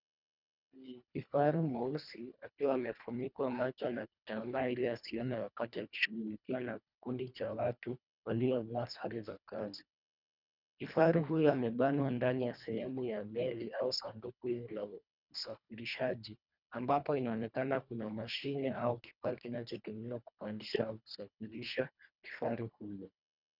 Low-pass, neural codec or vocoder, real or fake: 5.4 kHz; codec, 24 kHz, 1.5 kbps, HILCodec; fake